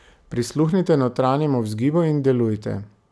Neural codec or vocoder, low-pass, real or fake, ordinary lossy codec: none; none; real; none